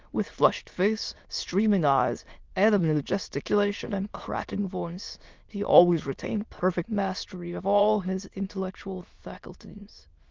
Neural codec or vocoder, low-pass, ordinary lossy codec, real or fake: autoencoder, 22.05 kHz, a latent of 192 numbers a frame, VITS, trained on many speakers; 7.2 kHz; Opus, 32 kbps; fake